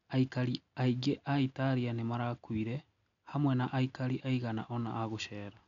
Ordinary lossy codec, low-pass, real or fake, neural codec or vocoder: none; 7.2 kHz; real; none